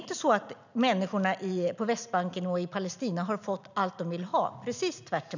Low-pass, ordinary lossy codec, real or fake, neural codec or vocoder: 7.2 kHz; none; real; none